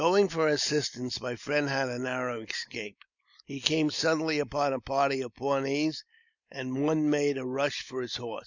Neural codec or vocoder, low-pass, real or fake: none; 7.2 kHz; real